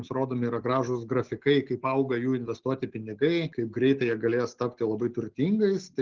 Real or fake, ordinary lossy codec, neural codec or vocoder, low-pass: real; Opus, 16 kbps; none; 7.2 kHz